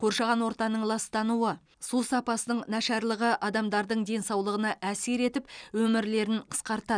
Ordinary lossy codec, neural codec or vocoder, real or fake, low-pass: none; none; real; 9.9 kHz